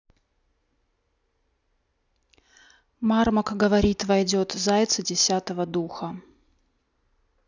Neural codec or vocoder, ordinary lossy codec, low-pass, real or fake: none; none; 7.2 kHz; real